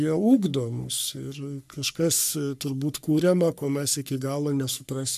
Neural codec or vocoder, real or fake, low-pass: codec, 44.1 kHz, 3.4 kbps, Pupu-Codec; fake; 14.4 kHz